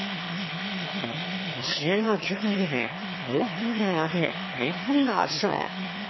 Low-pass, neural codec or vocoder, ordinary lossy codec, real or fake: 7.2 kHz; autoencoder, 22.05 kHz, a latent of 192 numbers a frame, VITS, trained on one speaker; MP3, 24 kbps; fake